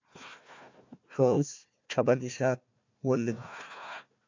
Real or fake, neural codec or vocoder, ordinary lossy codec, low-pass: fake; codec, 16 kHz, 1 kbps, FunCodec, trained on Chinese and English, 50 frames a second; MP3, 64 kbps; 7.2 kHz